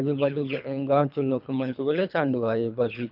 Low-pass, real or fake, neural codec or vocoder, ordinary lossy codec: 5.4 kHz; fake; codec, 24 kHz, 3 kbps, HILCodec; none